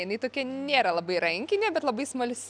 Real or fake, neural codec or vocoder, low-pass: real; none; 9.9 kHz